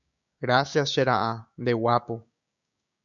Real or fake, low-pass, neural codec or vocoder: fake; 7.2 kHz; codec, 16 kHz, 6 kbps, DAC